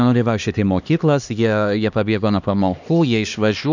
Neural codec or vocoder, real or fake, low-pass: codec, 16 kHz, 2 kbps, X-Codec, HuBERT features, trained on LibriSpeech; fake; 7.2 kHz